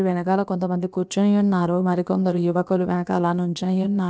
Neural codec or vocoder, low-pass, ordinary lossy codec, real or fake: codec, 16 kHz, about 1 kbps, DyCAST, with the encoder's durations; none; none; fake